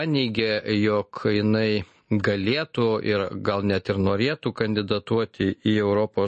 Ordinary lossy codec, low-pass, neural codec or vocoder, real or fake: MP3, 32 kbps; 10.8 kHz; none; real